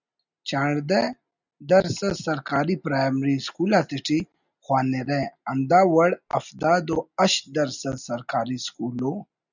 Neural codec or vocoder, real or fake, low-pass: none; real; 7.2 kHz